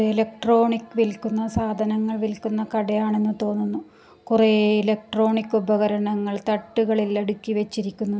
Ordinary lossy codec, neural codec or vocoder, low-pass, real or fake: none; none; none; real